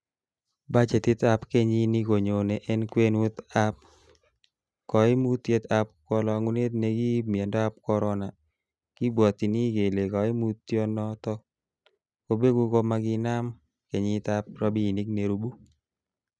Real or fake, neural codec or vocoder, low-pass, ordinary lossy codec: real; none; none; none